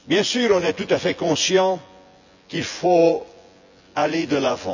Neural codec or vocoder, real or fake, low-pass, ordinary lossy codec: vocoder, 24 kHz, 100 mel bands, Vocos; fake; 7.2 kHz; none